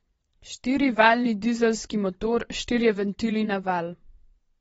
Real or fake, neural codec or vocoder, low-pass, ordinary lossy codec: fake; vocoder, 22.05 kHz, 80 mel bands, Vocos; 9.9 kHz; AAC, 24 kbps